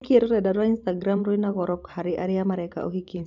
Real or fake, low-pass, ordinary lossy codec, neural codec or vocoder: fake; 7.2 kHz; none; vocoder, 44.1 kHz, 128 mel bands every 512 samples, BigVGAN v2